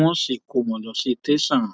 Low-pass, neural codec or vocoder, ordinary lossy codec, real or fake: none; none; none; real